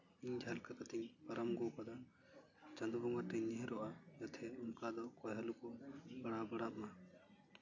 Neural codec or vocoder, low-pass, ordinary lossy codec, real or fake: none; 7.2 kHz; none; real